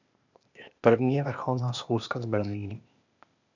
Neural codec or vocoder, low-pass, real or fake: codec, 16 kHz, 0.8 kbps, ZipCodec; 7.2 kHz; fake